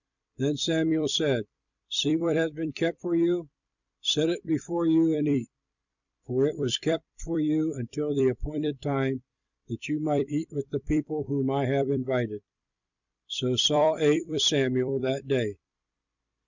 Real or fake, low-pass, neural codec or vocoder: fake; 7.2 kHz; vocoder, 44.1 kHz, 128 mel bands every 256 samples, BigVGAN v2